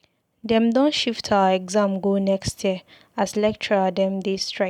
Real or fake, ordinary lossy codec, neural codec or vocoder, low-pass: real; none; none; 19.8 kHz